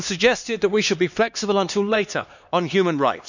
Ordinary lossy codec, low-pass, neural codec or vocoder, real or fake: none; 7.2 kHz; codec, 16 kHz, 2 kbps, X-Codec, HuBERT features, trained on LibriSpeech; fake